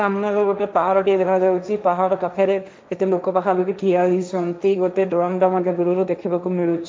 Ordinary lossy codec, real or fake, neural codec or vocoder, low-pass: none; fake; codec, 16 kHz, 1.1 kbps, Voila-Tokenizer; none